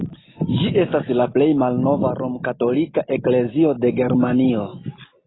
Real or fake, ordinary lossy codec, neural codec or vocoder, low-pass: real; AAC, 16 kbps; none; 7.2 kHz